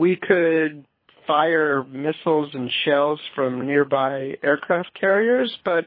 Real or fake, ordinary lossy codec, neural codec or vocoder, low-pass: fake; MP3, 24 kbps; codec, 24 kHz, 3 kbps, HILCodec; 5.4 kHz